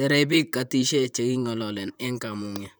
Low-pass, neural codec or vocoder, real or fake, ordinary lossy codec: none; vocoder, 44.1 kHz, 128 mel bands, Pupu-Vocoder; fake; none